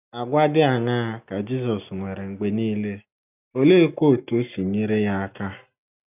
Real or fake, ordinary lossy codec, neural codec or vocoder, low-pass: real; none; none; 3.6 kHz